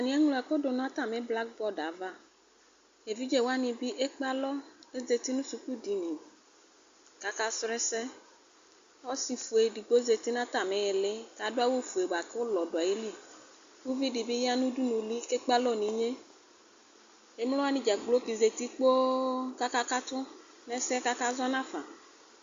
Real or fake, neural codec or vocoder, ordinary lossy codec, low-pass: real; none; Opus, 64 kbps; 7.2 kHz